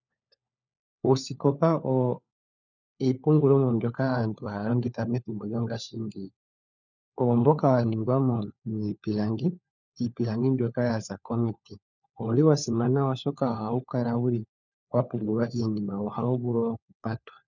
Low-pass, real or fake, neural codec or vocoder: 7.2 kHz; fake; codec, 16 kHz, 4 kbps, FunCodec, trained on LibriTTS, 50 frames a second